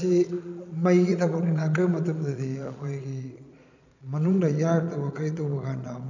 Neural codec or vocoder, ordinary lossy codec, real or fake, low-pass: vocoder, 22.05 kHz, 80 mel bands, Vocos; none; fake; 7.2 kHz